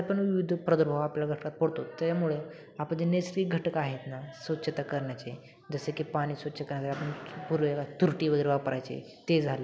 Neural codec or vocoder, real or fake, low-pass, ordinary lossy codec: none; real; none; none